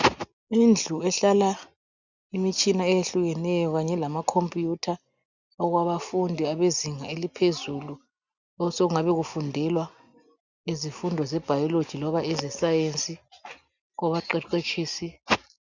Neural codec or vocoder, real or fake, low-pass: none; real; 7.2 kHz